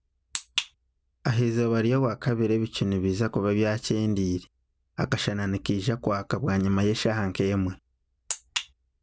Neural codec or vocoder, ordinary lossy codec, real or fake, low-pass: none; none; real; none